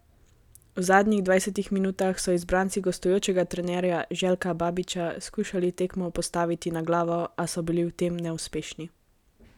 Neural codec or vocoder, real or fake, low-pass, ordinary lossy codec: none; real; 19.8 kHz; none